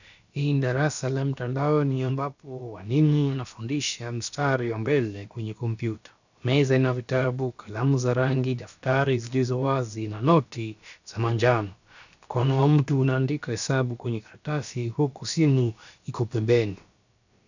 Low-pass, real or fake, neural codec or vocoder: 7.2 kHz; fake; codec, 16 kHz, about 1 kbps, DyCAST, with the encoder's durations